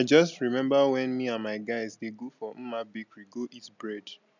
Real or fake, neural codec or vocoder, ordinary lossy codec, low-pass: real; none; none; 7.2 kHz